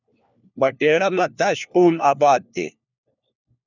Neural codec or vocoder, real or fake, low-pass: codec, 16 kHz, 1 kbps, FunCodec, trained on LibriTTS, 50 frames a second; fake; 7.2 kHz